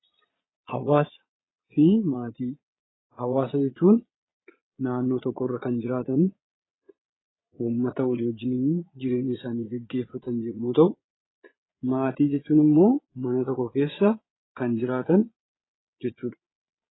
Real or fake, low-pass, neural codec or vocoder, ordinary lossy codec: fake; 7.2 kHz; vocoder, 22.05 kHz, 80 mel bands, Vocos; AAC, 16 kbps